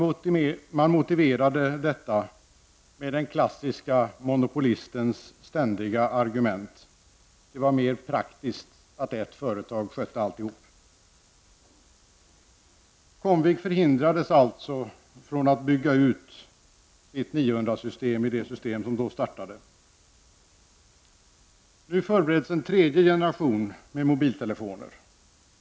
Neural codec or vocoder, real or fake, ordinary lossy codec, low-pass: none; real; none; none